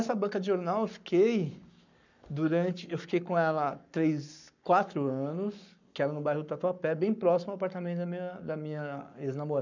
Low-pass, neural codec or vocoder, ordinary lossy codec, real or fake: 7.2 kHz; codec, 44.1 kHz, 7.8 kbps, Pupu-Codec; none; fake